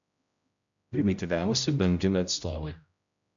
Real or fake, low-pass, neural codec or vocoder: fake; 7.2 kHz; codec, 16 kHz, 0.5 kbps, X-Codec, HuBERT features, trained on general audio